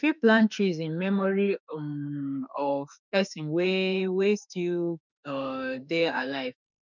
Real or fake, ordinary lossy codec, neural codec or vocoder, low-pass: fake; none; autoencoder, 48 kHz, 32 numbers a frame, DAC-VAE, trained on Japanese speech; 7.2 kHz